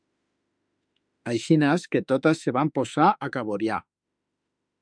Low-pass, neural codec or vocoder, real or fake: 9.9 kHz; autoencoder, 48 kHz, 32 numbers a frame, DAC-VAE, trained on Japanese speech; fake